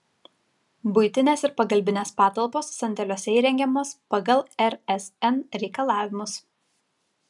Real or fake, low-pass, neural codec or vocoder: real; 10.8 kHz; none